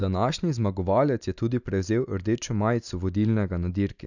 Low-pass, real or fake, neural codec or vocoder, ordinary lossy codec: 7.2 kHz; real; none; none